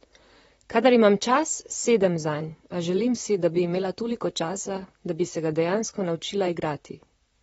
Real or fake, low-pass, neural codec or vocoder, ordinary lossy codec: fake; 19.8 kHz; vocoder, 44.1 kHz, 128 mel bands, Pupu-Vocoder; AAC, 24 kbps